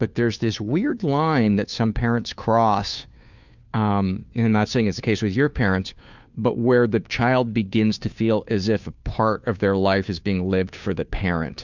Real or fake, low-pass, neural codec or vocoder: fake; 7.2 kHz; codec, 16 kHz, 2 kbps, FunCodec, trained on Chinese and English, 25 frames a second